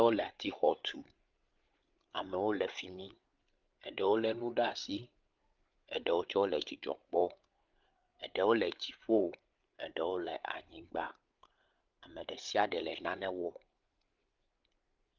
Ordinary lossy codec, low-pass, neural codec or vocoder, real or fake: Opus, 32 kbps; 7.2 kHz; codec, 16 kHz, 8 kbps, FreqCodec, larger model; fake